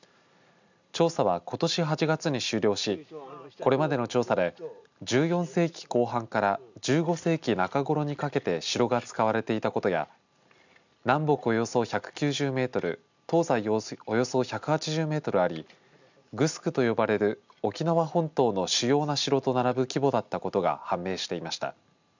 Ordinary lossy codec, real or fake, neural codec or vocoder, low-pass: none; real; none; 7.2 kHz